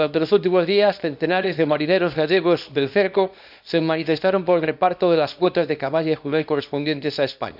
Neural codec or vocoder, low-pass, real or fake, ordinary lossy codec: codec, 24 kHz, 0.9 kbps, WavTokenizer, small release; 5.4 kHz; fake; none